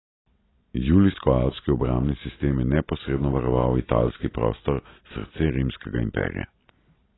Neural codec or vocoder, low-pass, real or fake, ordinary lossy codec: none; 7.2 kHz; real; AAC, 16 kbps